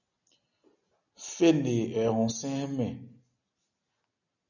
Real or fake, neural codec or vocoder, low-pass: real; none; 7.2 kHz